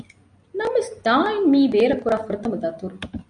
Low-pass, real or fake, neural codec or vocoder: 9.9 kHz; real; none